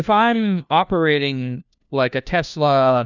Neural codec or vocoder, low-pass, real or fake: codec, 16 kHz, 1 kbps, FunCodec, trained on LibriTTS, 50 frames a second; 7.2 kHz; fake